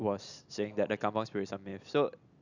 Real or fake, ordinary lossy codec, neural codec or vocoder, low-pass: real; none; none; 7.2 kHz